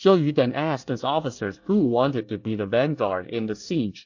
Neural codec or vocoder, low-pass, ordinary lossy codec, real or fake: codec, 24 kHz, 1 kbps, SNAC; 7.2 kHz; AAC, 48 kbps; fake